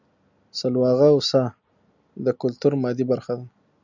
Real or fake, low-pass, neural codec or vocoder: real; 7.2 kHz; none